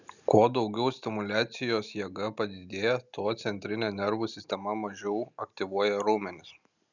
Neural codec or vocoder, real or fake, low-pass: none; real; 7.2 kHz